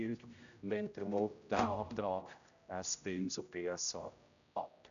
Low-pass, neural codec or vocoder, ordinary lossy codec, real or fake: 7.2 kHz; codec, 16 kHz, 0.5 kbps, X-Codec, HuBERT features, trained on general audio; none; fake